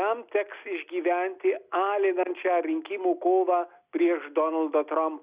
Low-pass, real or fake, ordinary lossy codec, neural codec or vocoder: 3.6 kHz; real; Opus, 64 kbps; none